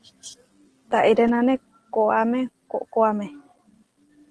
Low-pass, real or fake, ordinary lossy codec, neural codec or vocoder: 10.8 kHz; real; Opus, 16 kbps; none